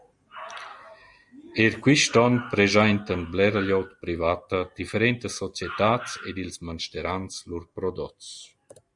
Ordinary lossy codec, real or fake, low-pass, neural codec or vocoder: Opus, 64 kbps; real; 10.8 kHz; none